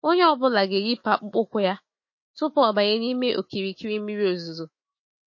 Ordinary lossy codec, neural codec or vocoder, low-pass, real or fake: MP3, 32 kbps; autoencoder, 48 kHz, 128 numbers a frame, DAC-VAE, trained on Japanese speech; 7.2 kHz; fake